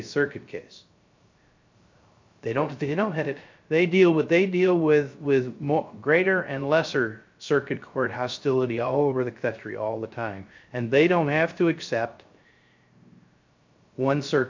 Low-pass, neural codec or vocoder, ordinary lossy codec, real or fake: 7.2 kHz; codec, 16 kHz, 0.3 kbps, FocalCodec; MP3, 48 kbps; fake